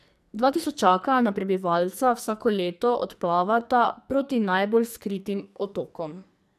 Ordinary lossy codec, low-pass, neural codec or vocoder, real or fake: none; 14.4 kHz; codec, 44.1 kHz, 2.6 kbps, SNAC; fake